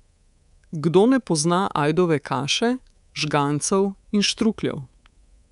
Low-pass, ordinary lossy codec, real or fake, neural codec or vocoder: 10.8 kHz; none; fake; codec, 24 kHz, 3.1 kbps, DualCodec